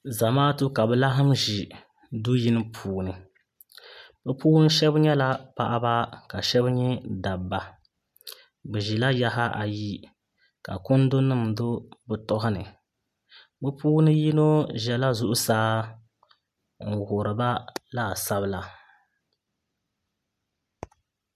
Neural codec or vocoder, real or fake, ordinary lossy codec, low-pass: none; real; MP3, 96 kbps; 14.4 kHz